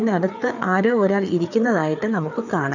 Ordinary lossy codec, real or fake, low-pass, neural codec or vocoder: none; fake; 7.2 kHz; codec, 16 kHz, 4 kbps, FreqCodec, larger model